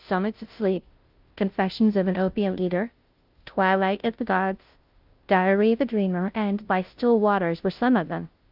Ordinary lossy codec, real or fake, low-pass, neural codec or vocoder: Opus, 32 kbps; fake; 5.4 kHz; codec, 16 kHz, 0.5 kbps, FunCodec, trained on Chinese and English, 25 frames a second